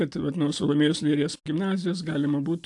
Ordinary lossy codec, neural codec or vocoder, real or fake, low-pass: AAC, 64 kbps; none; real; 10.8 kHz